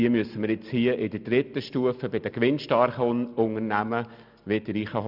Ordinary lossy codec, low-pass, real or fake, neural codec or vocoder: none; 5.4 kHz; real; none